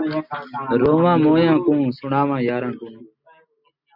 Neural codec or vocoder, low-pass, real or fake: none; 5.4 kHz; real